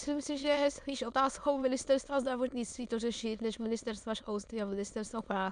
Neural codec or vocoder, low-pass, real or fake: autoencoder, 22.05 kHz, a latent of 192 numbers a frame, VITS, trained on many speakers; 9.9 kHz; fake